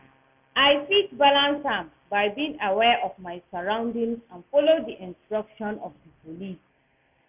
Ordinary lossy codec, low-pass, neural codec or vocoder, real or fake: none; 3.6 kHz; none; real